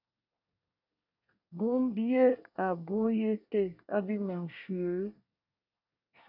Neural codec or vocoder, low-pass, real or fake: codec, 24 kHz, 1 kbps, SNAC; 5.4 kHz; fake